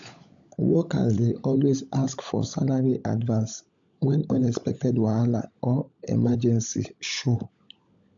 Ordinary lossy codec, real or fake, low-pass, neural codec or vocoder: AAC, 64 kbps; fake; 7.2 kHz; codec, 16 kHz, 16 kbps, FunCodec, trained on LibriTTS, 50 frames a second